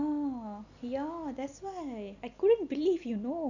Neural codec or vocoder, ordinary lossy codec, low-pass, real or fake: none; none; 7.2 kHz; real